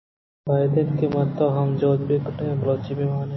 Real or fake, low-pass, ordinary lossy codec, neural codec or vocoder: real; 7.2 kHz; MP3, 24 kbps; none